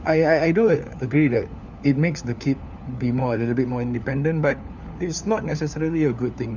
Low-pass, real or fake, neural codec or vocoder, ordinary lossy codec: 7.2 kHz; fake; codec, 16 kHz, 4 kbps, FunCodec, trained on LibriTTS, 50 frames a second; none